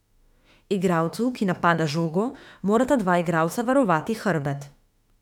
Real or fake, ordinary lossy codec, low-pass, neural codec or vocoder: fake; none; 19.8 kHz; autoencoder, 48 kHz, 32 numbers a frame, DAC-VAE, trained on Japanese speech